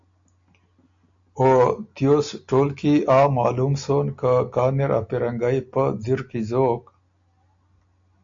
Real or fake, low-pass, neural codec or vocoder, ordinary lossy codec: real; 7.2 kHz; none; AAC, 64 kbps